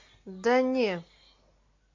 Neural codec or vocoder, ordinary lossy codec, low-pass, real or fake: none; MP3, 48 kbps; 7.2 kHz; real